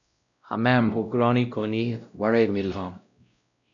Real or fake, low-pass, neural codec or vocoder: fake; 7.2 kHz; codec, 16 kHz, 0.5 kbps, X-Codec, WavLM features, trained on Multilingual LibriSpeech